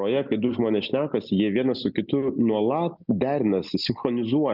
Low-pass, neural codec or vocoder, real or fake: 5.4 kHz; none; real